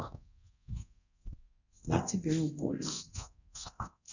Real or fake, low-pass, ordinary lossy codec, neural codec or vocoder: fake; 7.2 kHz; none; codec, 24 kHz, 0.9 kbps, DualCodec